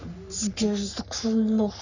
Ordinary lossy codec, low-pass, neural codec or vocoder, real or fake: none; 7.2 kHz; codec, 44.1 kHz, 3.4 kbps, Pupu-Codec; fake